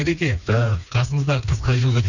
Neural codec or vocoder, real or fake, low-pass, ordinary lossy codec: codec, 16 kHz, 2 kbps, FreqCodec, smaller model; fake; 7.2 kHz; none